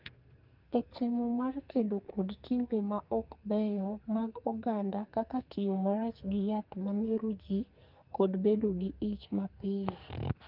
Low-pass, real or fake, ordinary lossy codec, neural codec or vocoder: 5.4 kHz; fake; Opus, 32 kbps; codec, 44.1 kHz, 2.6 kbps, SNAC